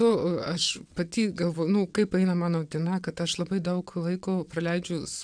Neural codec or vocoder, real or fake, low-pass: vocoder, 22.05 kHz, 80 mel bands, WaveNeXt; fake; 9.9 kHz